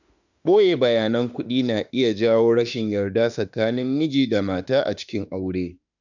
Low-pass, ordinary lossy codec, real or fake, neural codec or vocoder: 7.2 kHz; none; fake; autoencoder, 48 kHz, 32 numbers a frame, DAC-VAE, trained on Japanese speech